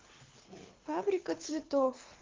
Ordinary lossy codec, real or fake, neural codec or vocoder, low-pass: Opus, 32 kbps; fake; codec, 24 kHz, 6 kbps, HILCodec; 7.2 kHz